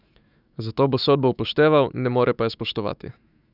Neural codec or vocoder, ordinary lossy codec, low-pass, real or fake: autoencoder, 48 kHz, 128 numbers a frame, DAC-VAE, trained on Japanese speech; none; 5.4 kHz; fake